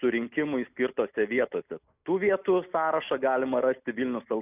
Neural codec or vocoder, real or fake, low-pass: none; real; 3.6 kHz